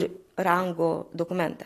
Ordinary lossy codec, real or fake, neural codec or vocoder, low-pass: AAC, 48 kbps; real; none; 14.4 kHz